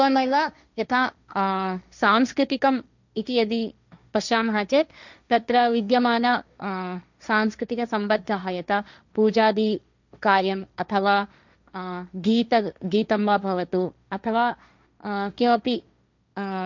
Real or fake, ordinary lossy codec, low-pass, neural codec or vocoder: fake; none; 7.2 kHz; codec, 16 kHz, 1.1 kbps, Voila-Tokenizer